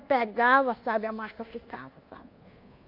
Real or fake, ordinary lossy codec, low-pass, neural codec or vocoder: fake; Opus, 64 kbps; 5.4 kHz; codec, 24 kHz, 1.2 kbps, DualCodec